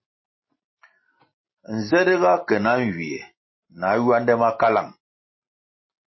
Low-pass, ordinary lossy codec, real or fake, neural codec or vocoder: 7.2 kHz; MP3, 24 kbps; real; none